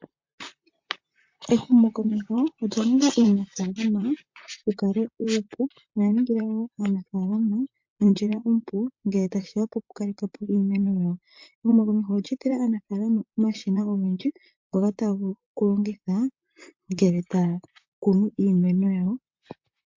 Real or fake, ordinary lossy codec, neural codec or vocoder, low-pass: fake; MP3, 48 kbps; vocoder, 44.1 kHz, 128 mel bands, Pupu-Vocoder; 7.2 kHz